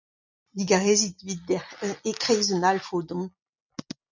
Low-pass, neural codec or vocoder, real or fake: 7.2 kHz; none; real